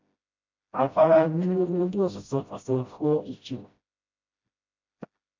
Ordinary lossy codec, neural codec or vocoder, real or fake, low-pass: MP3, 48 kbps; codec, 16 kHz, 0.5 kbps, FreqCodec, smaller model; fake; 7.2 kHz